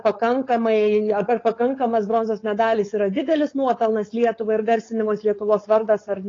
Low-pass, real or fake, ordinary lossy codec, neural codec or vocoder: 7.2 kHz; fake; AAC, 32 kbps; codec, 16 kHz, 4.8 kbps, FACodec